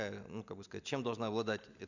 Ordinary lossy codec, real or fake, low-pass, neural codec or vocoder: none; real; 7.2 kHz; none